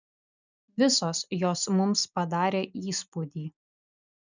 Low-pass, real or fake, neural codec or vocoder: 7.2 kHz; real; none